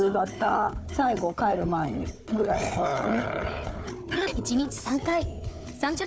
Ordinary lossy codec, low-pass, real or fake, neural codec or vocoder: none; none; fake; codec, 16 kHz, 4 kbps, FunCodec, trained on Chinese and English, 50 frames a second